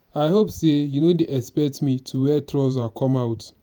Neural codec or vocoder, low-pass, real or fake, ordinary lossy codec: vocoder, 48 kHz, 128 mel bands, Vocos; none; fake; none